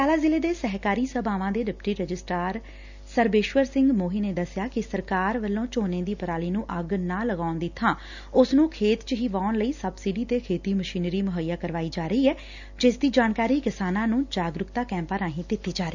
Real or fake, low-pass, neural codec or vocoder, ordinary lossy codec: real; 7.2 kHz; none; none